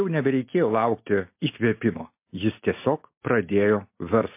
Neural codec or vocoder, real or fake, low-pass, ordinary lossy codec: none; real; 3.6 kHz; MP3, 24 kbps